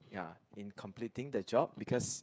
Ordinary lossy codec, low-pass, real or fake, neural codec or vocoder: none; none; fake; codec, 16 kHz, 16 kbps, FreqCodec, smaller model